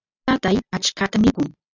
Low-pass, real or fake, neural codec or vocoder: 7.2 kHz; real; none